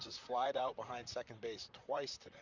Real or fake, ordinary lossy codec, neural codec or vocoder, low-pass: fake; Opus, 64 kbps; vocoder, 44.1 kHz, 128 mel bands, Pupu-Vocoder; 7.2 kHz